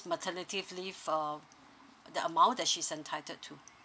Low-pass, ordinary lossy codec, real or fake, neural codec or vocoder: none; none; real; none